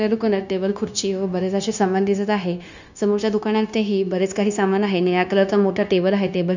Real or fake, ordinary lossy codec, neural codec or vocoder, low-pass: fake; none; codec, 16 kHz, 0.9 kbps, LongCat-Audio-Codec; 7.2 kHz